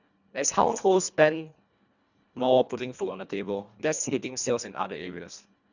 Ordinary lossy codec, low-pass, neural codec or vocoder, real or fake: none; 7.2 kHz; codec, 24 kHz, 1.5 kbps, HILCodec; fake